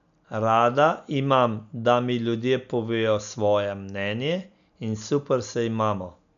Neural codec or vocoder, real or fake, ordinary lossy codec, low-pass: none; real; none; 7.2 kHz